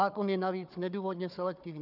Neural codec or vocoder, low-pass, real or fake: codec, 16 kHz, 4 kbps, FunCodec, trained on Chinese and English, 50 frames a second; 5.4 kHz; fake